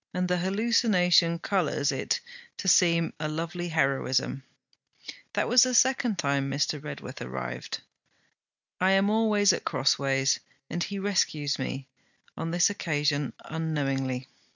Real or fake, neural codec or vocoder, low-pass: real; none; 7.2 kHz